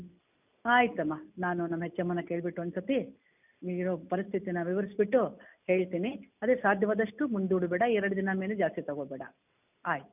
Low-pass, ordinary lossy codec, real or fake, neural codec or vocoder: 3.6 kHz; none; real; none